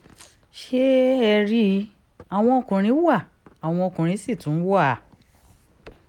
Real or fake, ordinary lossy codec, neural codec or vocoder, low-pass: real; none; none; 19.8 kHz